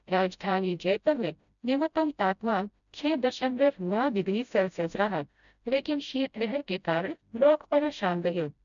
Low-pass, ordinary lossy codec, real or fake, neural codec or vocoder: 7.2 kHz; none; fake; codec, 16 kHz, 0.5 kbps, FreqCodec, smaller model